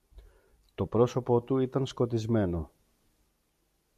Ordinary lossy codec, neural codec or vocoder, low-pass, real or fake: AAC, 96 kbps; none; 14.4 kHz; real